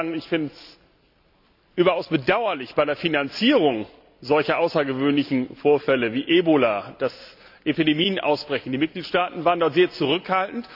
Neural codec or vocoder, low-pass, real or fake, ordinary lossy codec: none; 5.4 kHz; real; AAC, 48 kbps